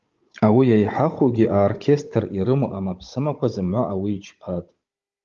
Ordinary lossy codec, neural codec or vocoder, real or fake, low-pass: Opus, 32 kbps; codec, 16 kHz, 16 kbps, FunCodec, trained on Chinese and English, 50 frames a second; fake; 7.2 kHz